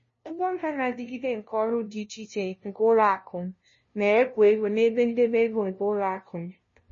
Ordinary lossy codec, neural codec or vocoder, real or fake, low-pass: MP3, 32 kbps; codec, 16 kHz, 0.5 kbps, FunCodec, trained on LibriTTS, 25 frames a second; fake; 7.2 kHz